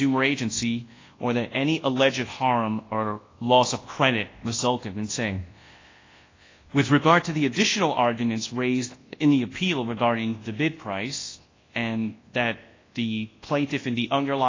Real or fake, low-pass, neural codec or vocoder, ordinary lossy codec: fake; 7.2 kHz; codec, 24 kHz, 0.9 kbps, WavTokenizer, large speech release; AAC, 32 kbps